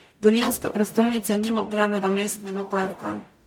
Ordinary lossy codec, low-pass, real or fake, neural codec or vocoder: MP3, 96 kbps; 19.8 kHz; fake; codec, 44.1 kHz, 0.9 kbps, DAC